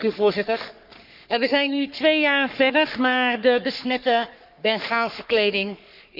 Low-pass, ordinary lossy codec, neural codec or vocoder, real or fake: 5.4 kHz; none; codec, 44.1 kHz, 3.4 kbps, Pupu-Codec; fake